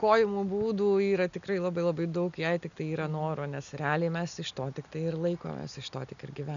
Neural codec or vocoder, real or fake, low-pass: none; real; 7.2 kHz